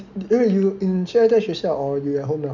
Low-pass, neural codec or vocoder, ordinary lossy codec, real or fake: 7.2 kHz; none; none; real